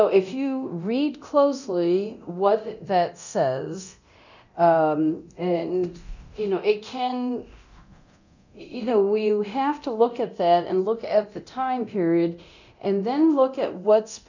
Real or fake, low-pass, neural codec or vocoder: fake; 7.2 kHz; codec, 24 kHz, 0.9 kbps, DualCodec